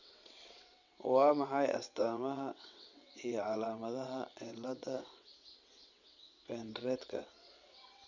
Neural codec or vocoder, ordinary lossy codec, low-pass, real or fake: vocoder, 22.05 kHz, 80 mel bands, WaveNeXt; none; 7.2 kHz; fake